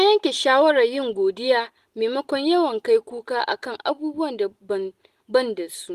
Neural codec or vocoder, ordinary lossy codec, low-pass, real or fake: none; Opus, 24 kbps; 14.4 kHz; real